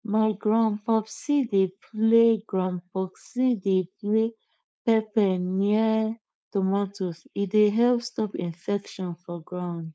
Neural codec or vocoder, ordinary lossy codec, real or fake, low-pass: codec, 16 kHz, 4.8 kbps, FACodec; none; fake; none